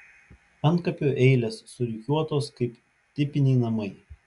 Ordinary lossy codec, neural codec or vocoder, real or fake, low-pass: MP3, 96 kbps; none; real; 10.8 kHz